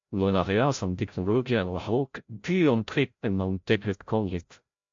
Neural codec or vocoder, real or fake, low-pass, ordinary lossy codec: codec, 16 kHz, 0.5 kbps, FreqCodec, larger model; fake; 7.2 kHz; MP3, 48 kbps